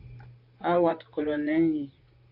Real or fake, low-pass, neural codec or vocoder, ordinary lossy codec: fake; 5.4 kHz; vocoder, 44.1 kHz, 128 mel bands, Pupu-Vocoder; AAC, 48 kbps